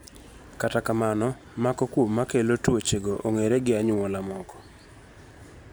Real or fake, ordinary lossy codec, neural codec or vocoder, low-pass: fake; none; vocoder, 44.1 kHz, 128 mel bands every 512 samples, BigVGAN v2; none